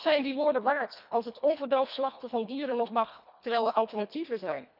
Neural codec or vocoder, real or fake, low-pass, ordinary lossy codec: codec, 24 kHz, 1.5 kbps, HILCodec; fake; 5.4 kHz; none